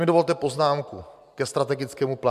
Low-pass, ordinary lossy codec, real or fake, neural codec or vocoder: 14.4 kHz; MP3, 96 kbps; fake; vocoder, 44.1 kHz, 128 mel bands every 512 samples, BigVGAN v2